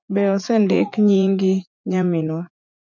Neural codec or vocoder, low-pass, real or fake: vocoder, 44.1 kHz, 80 mel bands, Vocos; 7.2 kHz; fake